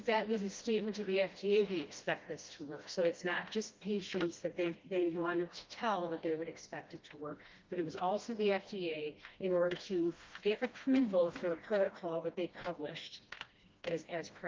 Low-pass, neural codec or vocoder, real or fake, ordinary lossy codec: 7.2 kHz; codec, 16 kHz, 1 kbps, FreqCodec, smaller model; fake; Opus, 32 kbps